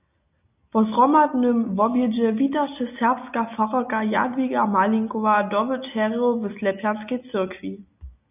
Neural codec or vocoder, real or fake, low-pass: none; real; 3.6 kHz